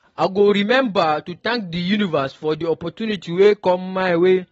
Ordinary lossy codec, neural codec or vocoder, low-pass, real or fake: AAC, 24 kbps; none; 19.8 kHz; real